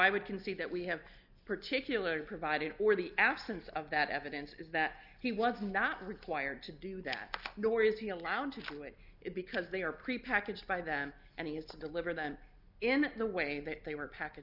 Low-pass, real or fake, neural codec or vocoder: 5.4 kHz; real; none